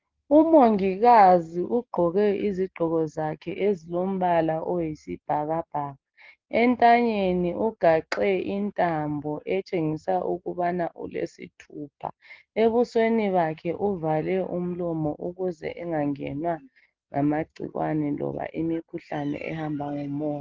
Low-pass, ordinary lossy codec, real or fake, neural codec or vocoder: 7.2 kHz; Opus, 16 kbps; real; none